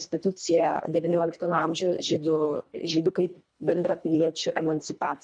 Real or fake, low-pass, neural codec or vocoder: fake; 9.9 kHz; codec, 24 kHz, 1.5 kbps, HILCodec